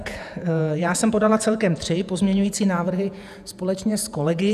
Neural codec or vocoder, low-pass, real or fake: vocoder, 48 kHz, 128 mel bands, Vocos; 14.4 kHz; fake